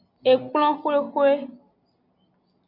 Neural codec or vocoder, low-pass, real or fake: none; 5.4 kHz; real